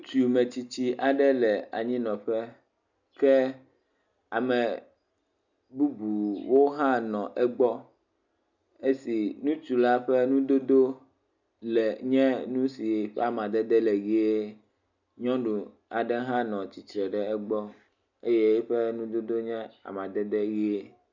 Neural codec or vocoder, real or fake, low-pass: none; real; 7.2 kHz